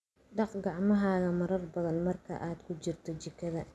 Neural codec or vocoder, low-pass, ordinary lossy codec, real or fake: none; none; none; real